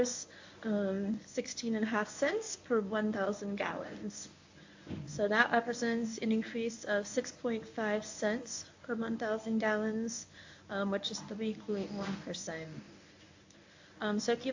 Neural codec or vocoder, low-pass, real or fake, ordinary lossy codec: codec, 24 kHz, 0.9 kbps, WavTokenizer, medium speech release version 1; 7.2 kHz; fake; AAC, 48 kbps